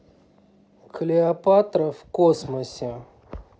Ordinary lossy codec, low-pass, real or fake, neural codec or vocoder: none; none; real; none